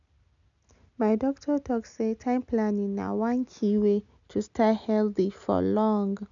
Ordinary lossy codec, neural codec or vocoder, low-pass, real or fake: MP3, 96 kbps; none; 7.2 kHz; real